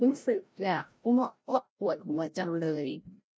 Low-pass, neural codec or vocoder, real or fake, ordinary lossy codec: none; codec, 16 kHz, 0.5 kbps, FreqCodec, larger model; fake; none